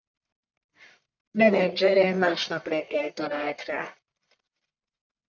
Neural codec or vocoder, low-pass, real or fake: codec, 44.1 kHz, 1.7 kbps, Pupu-Codec; 7.2 kHz; fake